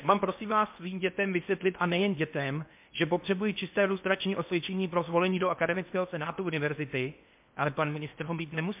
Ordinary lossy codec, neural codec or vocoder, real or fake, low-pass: MP3, 32 kbps; codec, 16 kHz in and 24 kHz out, 0.8 kbps, FocalCodec, streaming, 65536 codes; fake; 3.6 kHz